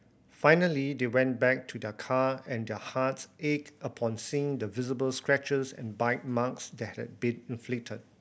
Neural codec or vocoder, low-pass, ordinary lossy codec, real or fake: none; none; none; real